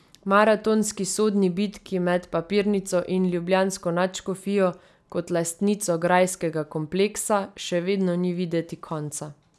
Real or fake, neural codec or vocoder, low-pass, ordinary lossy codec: real; none; none; none